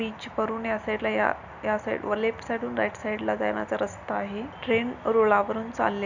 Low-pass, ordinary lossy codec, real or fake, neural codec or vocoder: 7.2 kHz; Opus, 64 kbps; real; none